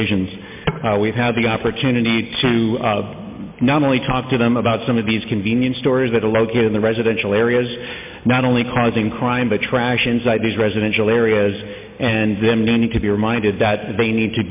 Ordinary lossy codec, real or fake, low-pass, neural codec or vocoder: MP3, 32 kbps; real; 3.6 kHz; none